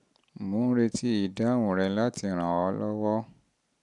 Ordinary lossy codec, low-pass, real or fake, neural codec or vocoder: none; 10.8 kHz; real; none